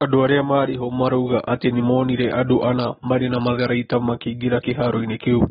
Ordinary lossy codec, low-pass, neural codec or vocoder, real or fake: AAC, 16 kbps; 7.2 kHz; none; real